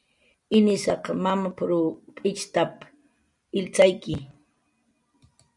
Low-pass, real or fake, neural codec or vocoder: 10.8 kHz; real; none